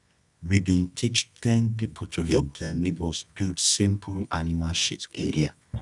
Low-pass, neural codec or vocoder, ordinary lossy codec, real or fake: 10.8 kHz; codec, 24 kHz, 0.9 kbps, WavTokenizer, medium music audio release; none; fake